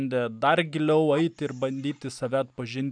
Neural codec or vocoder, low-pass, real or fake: none; 9.9 kHz; real